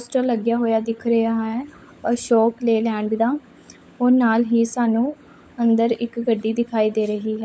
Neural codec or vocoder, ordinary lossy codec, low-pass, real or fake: codec, 16 kHz, 16 kbps, FunCodec, trained on LibriTTS, 50 frames a second; none; none; fake